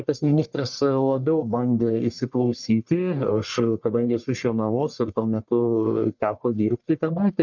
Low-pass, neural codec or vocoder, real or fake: 7.2 kHz; codec, 44.1 kHz, 1.7 kbps, Pupu-Codec; fake